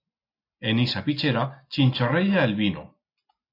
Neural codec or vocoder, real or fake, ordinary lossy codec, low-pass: none; real; AAC, 32 kbps; 5.4 kHz